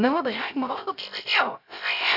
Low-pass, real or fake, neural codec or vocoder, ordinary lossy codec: 5.4 kHz; fake; codec, 16 kHz, 0.3 kbps, FocalCodec; none